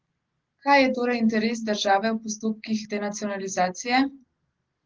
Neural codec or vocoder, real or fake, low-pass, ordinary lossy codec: none; real; 7.2 kHz; Opus, 16 kbps